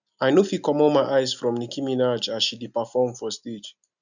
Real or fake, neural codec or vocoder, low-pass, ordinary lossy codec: real; none; 7.2 kHz; none